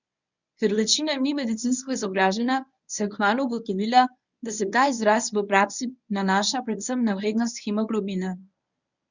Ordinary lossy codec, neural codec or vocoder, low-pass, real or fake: none; codec, 24 kHz, 0.9 kbps, WavTokenizer, medium speech release version 1; 7.2 kHz; fake